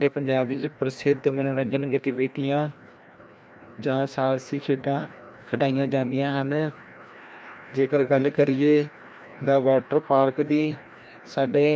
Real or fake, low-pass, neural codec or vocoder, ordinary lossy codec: fake; none; codec, 16 kHz, 1 kbps, FreqCodec, larger model; none